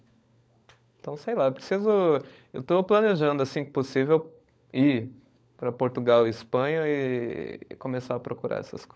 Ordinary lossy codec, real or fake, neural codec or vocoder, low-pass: none; fake; codec, 16 kHz, 16 kbps, FunCodec, trained on LibriTTS, 50 frames a second; none